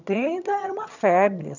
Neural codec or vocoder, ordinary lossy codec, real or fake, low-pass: vocoder, 22.05 kHz, 80 mel bands, HiFi-GAN; none; fake; 7.2 kHz